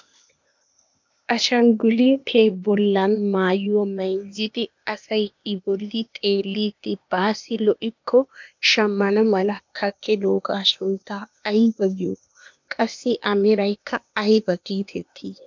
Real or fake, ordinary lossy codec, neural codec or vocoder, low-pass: fake; MP3, 64 kbps; codec, 16 kHz, 0.8 kbps, ZipCodec; 7.2 kHz